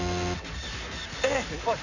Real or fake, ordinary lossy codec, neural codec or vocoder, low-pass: real; none; none; 7.2 kHz